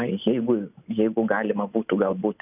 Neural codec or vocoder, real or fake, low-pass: none; real; 3.6 kHz